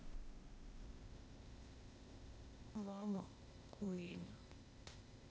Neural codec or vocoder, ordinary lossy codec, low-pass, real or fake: codec, 16 kHz, 0.8 kbps, ZipCodec; none; none; fake